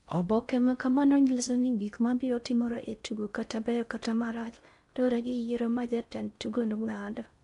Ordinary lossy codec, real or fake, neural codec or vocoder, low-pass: MP3, 64 kbps; fake; codec, 16 kHz in and 24 kHz out, 0.6 kbps, FocalCodec, streaming, 4096 codes; 10.8 kHz